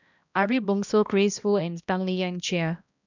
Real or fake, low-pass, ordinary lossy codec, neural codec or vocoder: fake; 7.2 kHz; none; codec, 16 kHz, 1 kbps, X-Codec, HuBERT features, trained on balanced general audio